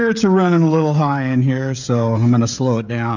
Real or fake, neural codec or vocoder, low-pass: fake; codec, 16 kHz, 16 kbps, FreqCodec, smaller model; 7.2 kHz